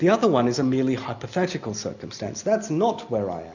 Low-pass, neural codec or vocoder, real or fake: 7.2 kHz; none; real